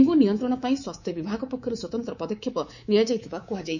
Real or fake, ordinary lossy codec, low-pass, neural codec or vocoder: fake; none; 7.2 kHz; codec, 24 kHz, 3.1 kbps, DualCodec